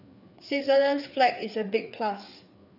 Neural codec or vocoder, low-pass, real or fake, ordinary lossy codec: codec, 16 kHz, 4 kbps, FreqCodec, larger model; 5.4 kHz; fake; none